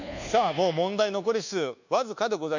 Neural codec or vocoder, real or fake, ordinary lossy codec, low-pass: codec, 24 kHz, 1.2 kbps, DualCodec; fake; none; 7.2 kHz